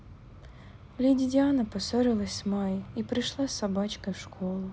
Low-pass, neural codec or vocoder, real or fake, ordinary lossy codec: none; none; real; none